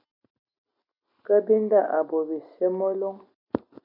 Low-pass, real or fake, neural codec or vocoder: 5.4 kHz; real; none